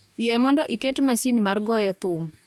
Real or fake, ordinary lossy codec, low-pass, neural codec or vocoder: fake; none; 19.8 kHz; codec, 44.1 kHz, 2.6 kbps, DAC